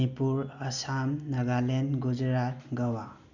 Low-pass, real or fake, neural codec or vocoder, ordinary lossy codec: 7.2 kHz; real; none; none